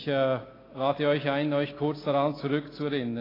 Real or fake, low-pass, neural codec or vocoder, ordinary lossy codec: real; 5.4 kHz; none; AAC, 24 kbps